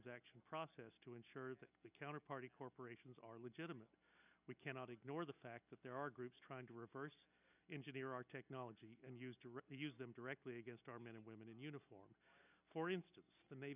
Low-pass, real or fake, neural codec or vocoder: 3.6 kHz; real; none